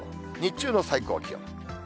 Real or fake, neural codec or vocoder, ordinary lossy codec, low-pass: real; none; none; none